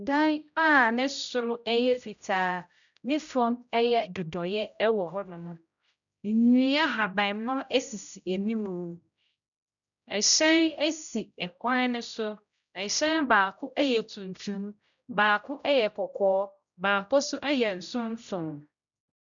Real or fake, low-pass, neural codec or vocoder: fake; 7.2 kHz; codec, 16 kHz, 0.5 kbps, X-Codec, HuBERT features, trained on general audio